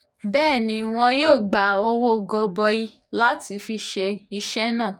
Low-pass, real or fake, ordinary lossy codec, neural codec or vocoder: 19.8 kHz; fake; none; codec, 44.1 kHz, 2.6 kbps, DAC